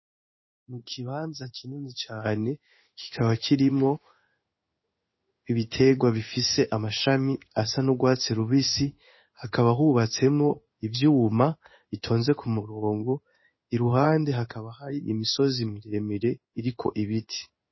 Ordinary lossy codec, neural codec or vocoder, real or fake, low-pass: MP3, 24 kbps; codec, 16 kHz in and 24 kHz out, 1 kbps, XY-Tokenizer; fake; 7.2 kHz